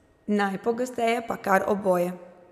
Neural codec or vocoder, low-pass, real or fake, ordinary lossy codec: none; 14.4 kHz; real; none